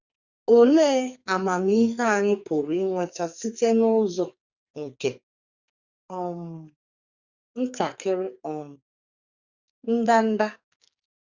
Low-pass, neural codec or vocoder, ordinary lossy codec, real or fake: 7.2 kHz; codec, 44.1 kHz, 2.6 kbps, SNAC; Opus, 64 kbps; fake